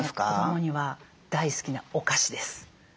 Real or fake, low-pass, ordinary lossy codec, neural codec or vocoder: real; none; none; none